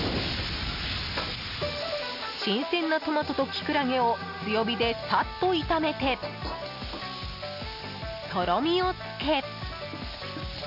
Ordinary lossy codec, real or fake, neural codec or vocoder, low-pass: none; real; none; 5.4 kHz